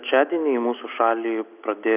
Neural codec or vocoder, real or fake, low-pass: none; real; 3.6 kHz